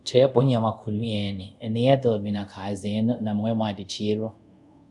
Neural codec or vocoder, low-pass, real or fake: codec, 24 kHz, 0.5 kbps, DualCodec; 10.8 kHz; fake